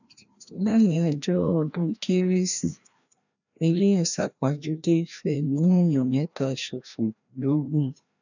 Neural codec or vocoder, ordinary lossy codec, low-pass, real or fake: codec, 16 kHz, 1 kbps, FreqCodec, larger model; none; 7.2 kHz; fake